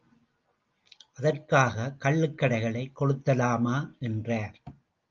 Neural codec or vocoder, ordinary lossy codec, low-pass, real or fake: none; Opus, 24 kbps; 7.2 kHz; real